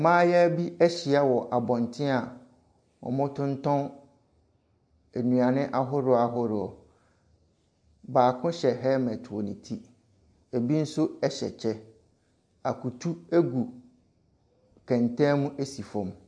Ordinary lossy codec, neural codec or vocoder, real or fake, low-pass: MP3, 64 kbps; none; real; 9.9 kHz